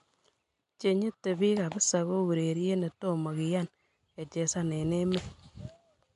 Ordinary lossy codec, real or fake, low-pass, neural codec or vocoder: MP3, 64 kbps; real; 10.8 kHz; none